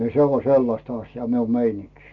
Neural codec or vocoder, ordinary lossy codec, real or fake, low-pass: none; none; real; 7.2 kHz